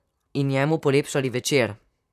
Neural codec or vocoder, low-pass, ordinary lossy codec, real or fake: vocoder, 44.1 kHz, 128 mel bands, Pupu-Vocoder; 14.4 kHz; none; fake